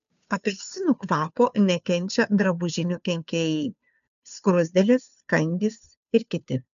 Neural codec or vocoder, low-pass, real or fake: codec, 16 kHz, 2 kbps, FunCodec, trained on Chinese and English, 25 frames a second; 7.2 kHz; fake